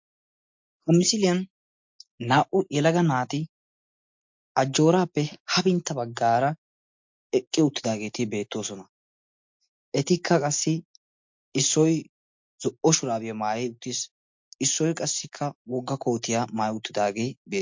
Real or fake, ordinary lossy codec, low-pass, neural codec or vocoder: real; MP3, 48 kbps; 7.2 kHz; none